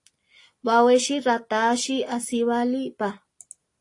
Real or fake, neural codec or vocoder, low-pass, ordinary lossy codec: real; none; 10.8 kHz; AAC, 48 kbps